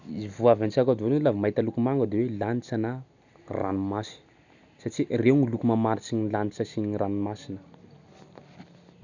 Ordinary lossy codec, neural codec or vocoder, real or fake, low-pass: none; none; real; 7.2 kHz